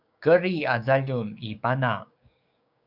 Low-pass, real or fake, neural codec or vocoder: 5.4 kHz; fake; codec, 16 kHz, 6 kbps, DAC